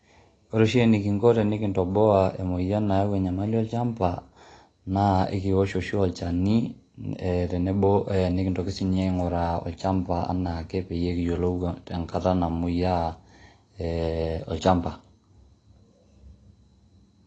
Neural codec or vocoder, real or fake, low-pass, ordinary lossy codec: none; real; 9.9 kHz; AAC, 32 kbps